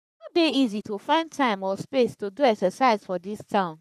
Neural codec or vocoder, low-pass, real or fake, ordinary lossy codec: codec, 44.1 kHz, 3.4 kbps, Pupu-Codec; 14.4 kHz; fake; none